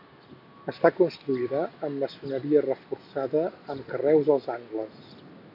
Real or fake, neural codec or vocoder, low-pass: real; none; 5.4 kHz